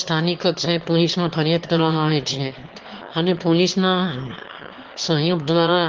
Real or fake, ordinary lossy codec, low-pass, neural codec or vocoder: fake; Opus, 16 kbps; 7.2 kHz; autoencoder, 22.05 kHz, a latent of 192 numbers a frame, VITS, trained on one speaker